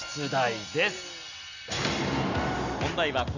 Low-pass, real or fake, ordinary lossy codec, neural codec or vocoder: 7.2 kHz; real; none; none